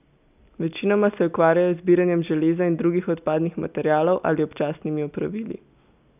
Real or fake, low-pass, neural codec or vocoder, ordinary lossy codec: real; 3.6 kHz; none; none